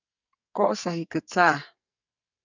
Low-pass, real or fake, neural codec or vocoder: 7.2 kHz; fake; codec, 44.1 kHz, 2.6 kbps, SNAC